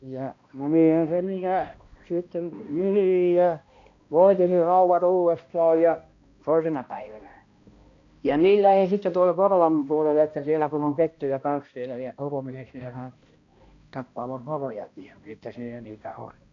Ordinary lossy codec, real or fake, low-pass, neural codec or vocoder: AAC, 48 kbps; fake; 7.2 kHz; codec, 16 kHz, 1 kbps, X-Codec, HuBERT features, trained on balanced general audio